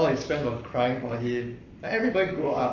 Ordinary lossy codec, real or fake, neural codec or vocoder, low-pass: none; fake; codec, 44.1 kHz, 7.8 kbps, Pupu-Codec; 7.2 kHz